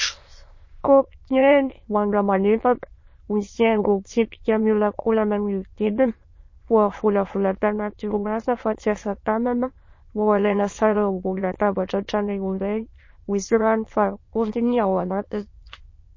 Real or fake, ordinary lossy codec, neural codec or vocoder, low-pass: fake; MP3, 32 kbps; autoencoder, 22.05 kHz, a latent of 192 numbers a frame, VITS, trained on many speakers; 7.2 kHz